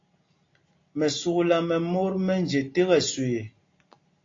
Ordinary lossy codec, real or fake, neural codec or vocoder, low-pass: AAC, 32 kbps; real; none; 7.2 kHz